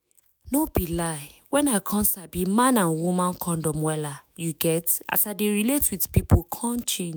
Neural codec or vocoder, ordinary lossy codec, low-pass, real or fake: autoencoder, 48 kHz, 128 numbers a frame, DAC-VAE, trained on Japanese speech; none; none; fake